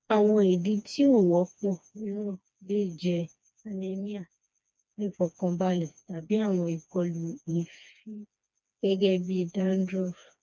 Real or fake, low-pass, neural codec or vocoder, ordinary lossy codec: fake; none; codec, 16 kHz, 2 kbps, FreqCodec, smaller model; none